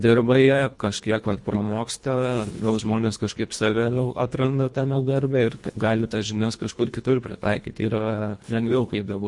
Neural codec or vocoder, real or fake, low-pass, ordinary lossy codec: codec, 24 kHz, 1.5 kbps, HILCodec; fake; 10.8 kHz; MP3, 48 kbps